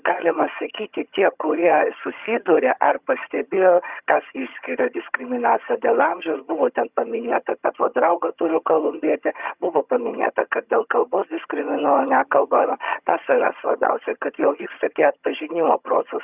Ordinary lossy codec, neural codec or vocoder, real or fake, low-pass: Opus, 64 kbps; vocoder, 22.05 kHz, 80 mel bands, HiFi-GAN; fake; 3.6 kHz